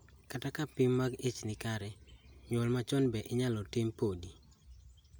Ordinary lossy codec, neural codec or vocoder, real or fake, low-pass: none; none; real; none